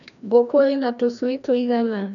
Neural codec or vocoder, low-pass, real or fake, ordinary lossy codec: codec, 16 kHz, 1 kbps, FreqCodec, larger model; 7.2 kHz; fake; none